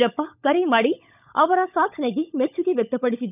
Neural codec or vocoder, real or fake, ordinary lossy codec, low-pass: codec, 16 kHz, 16 kbps, FunCodec, trained on LibriTTS, 50 frames a second; fake; none; 3.6 kHz